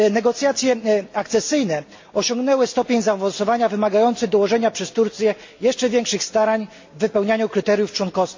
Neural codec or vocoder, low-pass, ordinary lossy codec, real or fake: none; 7.2 kHz; MP3, 48 kbps; real